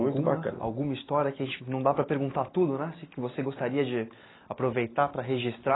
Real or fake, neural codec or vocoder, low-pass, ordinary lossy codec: real; none; 7.2 kHz; AAC, 16 kbps